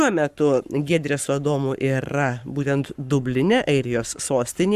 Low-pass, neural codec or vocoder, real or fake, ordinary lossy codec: 14.4 kHz; codec, 44.1 kHz, 7.8 kbps, Pupu-Codec; fake; Opus, 64 kbps